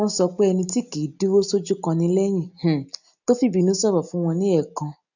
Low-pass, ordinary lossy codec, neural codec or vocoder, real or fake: 7.2 kHz; none; none; real